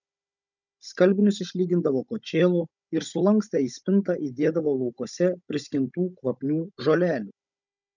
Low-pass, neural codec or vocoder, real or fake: 7.2 kHz; codec, 16 kHz, 16 kbps, FunCodec, trained on Chinese and English, 50 frames a second; fake